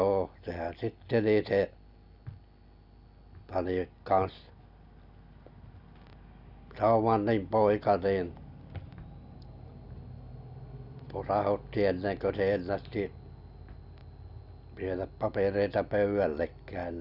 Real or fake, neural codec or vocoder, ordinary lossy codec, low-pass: real; none; none; 5.4 kHz